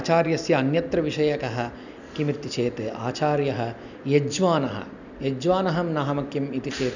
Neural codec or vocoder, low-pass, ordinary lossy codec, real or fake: none; 7.2 kHz; none; real